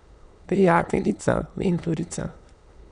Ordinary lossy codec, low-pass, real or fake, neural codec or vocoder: none; 9.9 kHz; fake; autoencoder, 22.05 kHz, a latent of 192 numbers a frame, VITS, trained on many speakers